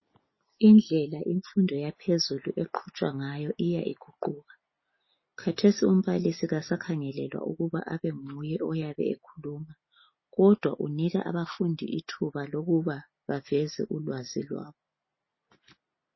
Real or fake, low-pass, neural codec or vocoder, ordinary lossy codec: real; 7.2 kHz; none; MP3, 24 kbps